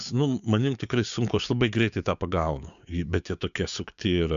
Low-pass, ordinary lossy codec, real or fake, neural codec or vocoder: 7.2 kHz; AAC, 96 kbps; fake; codec, 16 kHz, 6 kbps, DAC